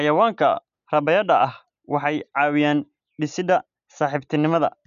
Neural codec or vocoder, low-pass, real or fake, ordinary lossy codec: none; 7.2 kHz; real; none